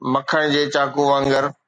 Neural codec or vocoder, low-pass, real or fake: none; 9.9 kHz; real